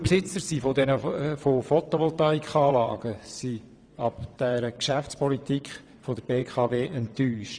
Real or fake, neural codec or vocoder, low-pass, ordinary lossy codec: fake; vocoder, 22.05 kHz, 80 mel bands, WaveNeXt; 9.9 kHz; Opus, 64 kbps